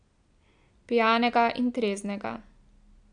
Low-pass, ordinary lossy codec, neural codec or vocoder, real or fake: 9.9 kHz; AAC, 64 kbps; none; real